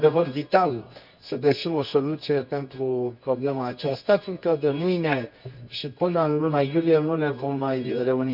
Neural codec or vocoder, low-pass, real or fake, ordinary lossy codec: codec, 24 kHz, 0.9 kbps, WavTokenizer, medium music audio release; 5.4 kHz; fake; none